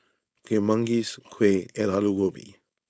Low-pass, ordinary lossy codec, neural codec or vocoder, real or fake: none; none; codec, 16 kHz, 4.8 kbps, FACodec; fake